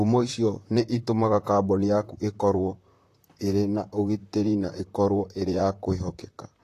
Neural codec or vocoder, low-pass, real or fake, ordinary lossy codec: vocoder, 44.1 kHz, 128 mel bands, Pupu-Vocoder; 14.4 kHz; fake; AAC, 48 kbps